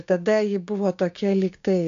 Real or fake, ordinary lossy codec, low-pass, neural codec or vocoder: fake; AAC, 64 kbps; 7.2 kHz; codec, 16 kHz, 6 kbps, DAC